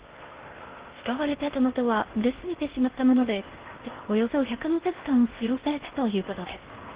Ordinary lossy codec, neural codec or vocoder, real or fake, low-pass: Opus, 16 kbps; codec, 16 kHz in and 24 kHz out, 0.6 kbps, FocalCodec, streaming, 2048 codes; fake; 3.6 kHz